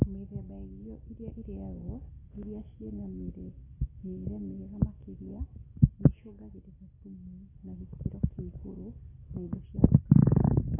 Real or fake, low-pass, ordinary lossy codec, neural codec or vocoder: real; 3.6 kHz; AAC, 16 kbps; none